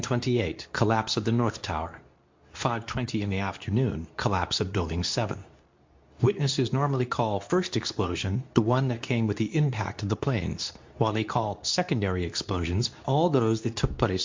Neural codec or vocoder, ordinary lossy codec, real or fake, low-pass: codec, 24 kHz, 0.9 kbps, WavTokenizer, medium speech release version 2; MP3, 48 kbps; fake; 7.2 kHz